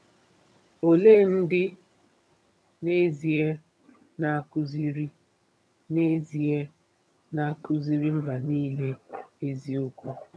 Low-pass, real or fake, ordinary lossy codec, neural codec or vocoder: none; fake; none; vocoder, 22.05 kHz, 80 mel bands, HiFi-GAN